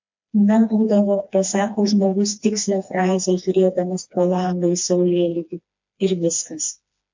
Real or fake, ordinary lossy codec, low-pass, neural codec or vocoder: fake; MP3, 48 kbps; 7.2 kHz; codec, 16 kHz, 2 kbps, FreqCodec, smaller model